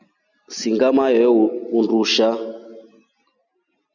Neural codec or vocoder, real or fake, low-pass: none; real; 7.2 kHz